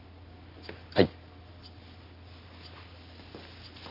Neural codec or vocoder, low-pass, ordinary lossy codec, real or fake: none; 5.4 kHz; none; real